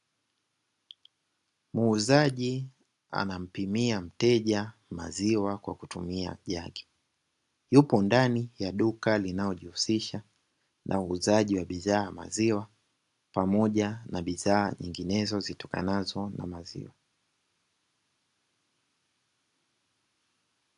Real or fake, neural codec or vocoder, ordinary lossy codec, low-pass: real; none; AAC, 64 kbps; 10.8 kHz